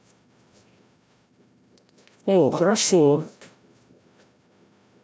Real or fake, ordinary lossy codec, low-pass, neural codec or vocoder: fake; none; none; codec, 16 kHz, 0.5 kbps, FreqCodec, larger model